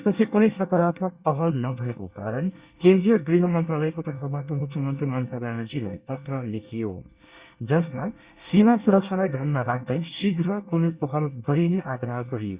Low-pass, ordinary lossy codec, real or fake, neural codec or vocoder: 3.6 kHz; Opus, 64 kbps; fake; codec, 24 kHz, 1 kbps, SNAC